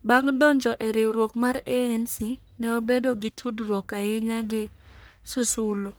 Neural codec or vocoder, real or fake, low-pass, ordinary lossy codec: codec, 44.1 kHz, 1.7 kbps, Pupu-Codec; fake; none; none